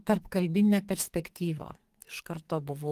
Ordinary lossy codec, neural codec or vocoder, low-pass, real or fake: Opus, 24 kbps; codec, 44.1 kHz, 2.6 kbps, SNAC; 14.4 kHz; fake